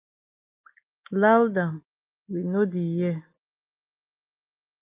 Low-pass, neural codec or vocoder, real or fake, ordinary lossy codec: 3.6 kHz; none; real; Opus, 24 kbps